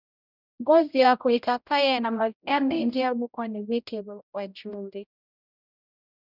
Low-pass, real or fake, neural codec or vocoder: 5.4 kHz; fake; codec, 16 kHz, 0.5 kbps, X-Codec, HuBERT features, trained on general audio